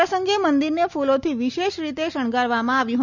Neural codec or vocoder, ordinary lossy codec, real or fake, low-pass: none; none; real; 7.2 kHz